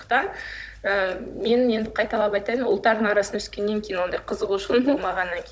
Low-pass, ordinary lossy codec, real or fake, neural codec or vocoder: none; none; fake; codec, 16 kHz, 16 kbps, FunCodec, trained on Chinese and English, 50 frames a second